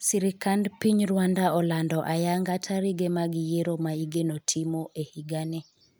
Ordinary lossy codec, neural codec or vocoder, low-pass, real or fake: none; none; none; real